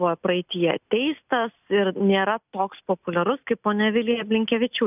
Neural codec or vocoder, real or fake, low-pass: none; real; 3.6 kHz